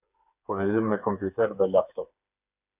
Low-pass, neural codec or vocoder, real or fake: 3.6 kHz; codec, 16 kHz, 4 kbps, FreqCodec, smaller model; fake